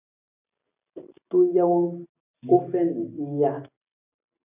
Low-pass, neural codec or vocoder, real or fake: 3.6 kHz; none; real